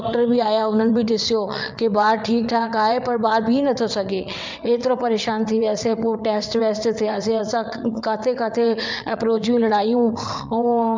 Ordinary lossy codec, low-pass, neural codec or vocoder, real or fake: MP3, 64 kbps; 7.2 kHz; vocoder, 22.05 kHz, 80 mel bands, WaveNeXt; fake